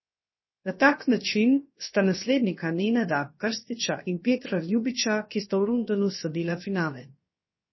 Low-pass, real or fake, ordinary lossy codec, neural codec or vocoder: 7.2 kHz; fake; MP3, 24 kbps; codec, 16 kHz, 0.7 kbps, FocalCodec